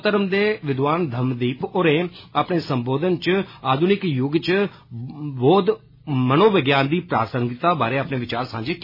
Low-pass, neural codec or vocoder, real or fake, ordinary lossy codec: 5.4 kHz; none; real; MP3, 24 kbps